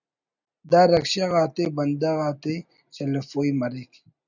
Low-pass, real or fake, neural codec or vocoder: 7.2 kHz; real; none